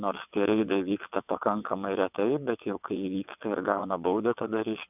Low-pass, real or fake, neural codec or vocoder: 3.6 kHz; fake; vocoder, 22.05 kHz, 80 mel bands, WaveNeXt